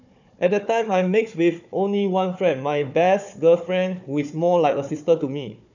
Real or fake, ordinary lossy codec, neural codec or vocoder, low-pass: fake; none; codec, 16 kHz, 4 kbps, FunCodec, trained on Chinese and English, 50 frames a second; 7.2 kHz